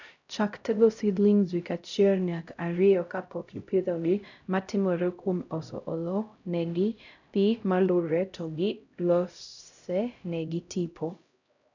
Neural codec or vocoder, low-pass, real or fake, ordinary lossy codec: codec, 16 kHz, 0.5 kbps, X-Codec, HuBERT features, trained on LibriSpeech; 7.2 kHz; fake; none